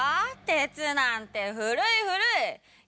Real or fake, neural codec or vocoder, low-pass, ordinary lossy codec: real; none; none; none